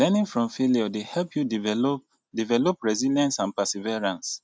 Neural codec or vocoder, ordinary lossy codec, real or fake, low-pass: none; none; real; none